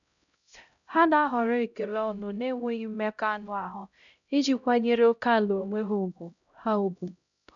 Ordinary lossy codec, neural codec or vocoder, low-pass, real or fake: none; codec, 16 kHz, 0.5 kbps, X-Codec, HuBERT features, trained on LibriSpeech; 7.2 kHz; fake